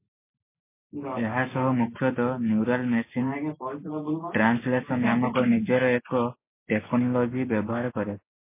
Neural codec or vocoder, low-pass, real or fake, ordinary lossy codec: none; 3.6 kHz; real; MP3, 24 kbps